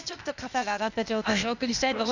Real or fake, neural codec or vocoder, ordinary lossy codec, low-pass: fake; codec, 16 kHz, 0.8 kbps, ZipCodec; none; 7.2 kHz